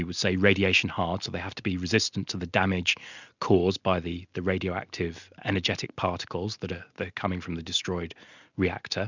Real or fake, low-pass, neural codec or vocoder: real; 7.2 kHz; none